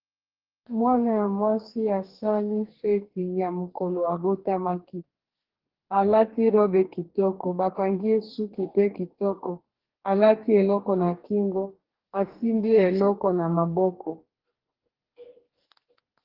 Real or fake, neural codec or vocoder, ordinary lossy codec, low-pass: fake; codec, 44.1 kHz, 2.6 kbps, DAC; Opus, 16 kbps; 5.4 kHz